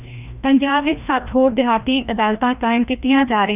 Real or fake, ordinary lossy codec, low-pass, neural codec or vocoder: fake; none; 3.6 kHz; codec, 16 kHz, 1 kbps, FreqCodec, larger model